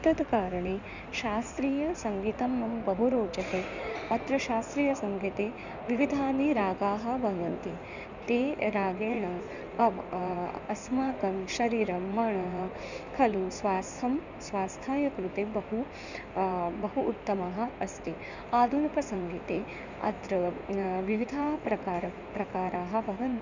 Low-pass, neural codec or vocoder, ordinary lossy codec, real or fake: 7.2 kHz; codec, 16 kHz in and 24 kHz out, 2.2 kbps, FireRedTTS-2 codec; none; fake